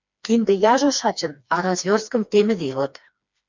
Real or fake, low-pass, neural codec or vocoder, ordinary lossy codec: fake; 7.2 kHz; codec, 16 kHz, 2 kbps, FreqCodec, smaller model; MP3, 64 kbps